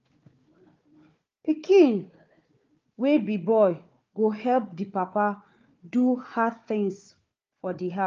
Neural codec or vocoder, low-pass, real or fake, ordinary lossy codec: codec, 16 kHz, 4 kbps, FunCodec, trained on Chinese and English, 50 frames a second; 7.2 kHz; fake; Opus, 24 kbps